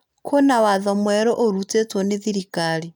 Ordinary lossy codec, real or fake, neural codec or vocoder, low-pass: none; real; none; 19.8 kHz